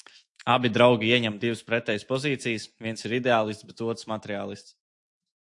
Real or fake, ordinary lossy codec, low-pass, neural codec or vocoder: fake; AAC, 64 kbps; 10.8 kHz; autoencoder, 48 kHz, 128 numbers a frame, DAC-VAE, trained on Japanese speech